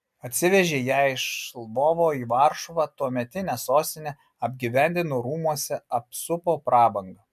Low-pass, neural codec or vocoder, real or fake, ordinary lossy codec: 14.4 kHz; none; real; MP3, 96 kbps